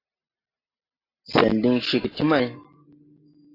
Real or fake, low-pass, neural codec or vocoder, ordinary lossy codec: real; 5.4 kHz; none; AAC, 32 kbps